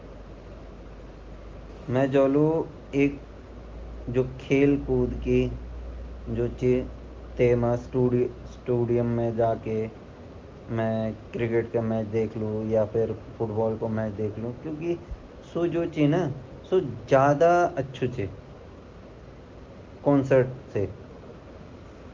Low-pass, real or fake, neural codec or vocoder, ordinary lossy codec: 7.2 kHz; real; none; Opus, 32 kbps